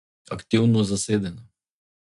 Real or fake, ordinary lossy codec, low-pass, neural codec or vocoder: real; MP3, 64 kbps; 10.8 kHz; none